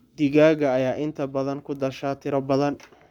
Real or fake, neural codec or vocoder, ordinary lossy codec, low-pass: fake; codec, 44.1 kHz, 7.8 kbps, Pupu-Codec; none; 19.8 kHz